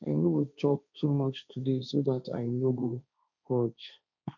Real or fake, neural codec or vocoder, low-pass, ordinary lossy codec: fake; codec, 16 kHz, 1.1 kbps, Voila-Tokenizer; 7.2 kHz; none